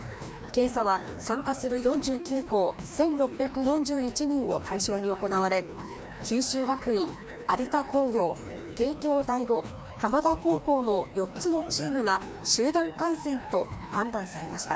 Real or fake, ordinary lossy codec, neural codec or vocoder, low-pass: fake; none; codec, 16 kHz, 1 kbps, FreqCodec, larger model; none